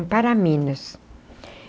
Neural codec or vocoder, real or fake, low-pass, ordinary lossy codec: none; real; none; none